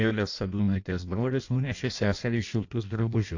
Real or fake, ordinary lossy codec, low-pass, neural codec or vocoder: fake; AAC, 48 kbps; 7.2 kHz; codec, 16 kHz in and 24 kHz out, 0.6 kbps, FireRedTTS-2 codec